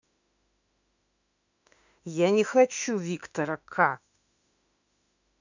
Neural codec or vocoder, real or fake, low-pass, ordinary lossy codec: autoencoder, 48 kHz, 32 numbers a frame, DAC-VAE, trained on Japanese speech; fake; 7.2 kHz; none